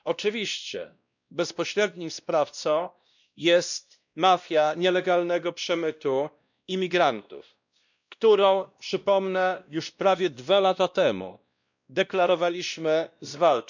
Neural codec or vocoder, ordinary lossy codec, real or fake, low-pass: codec, 16 kHz, 1 kbps, X-Codec, WavLM features, trained on Multilingual LibriSpeech; none; fake; 7.2 kHz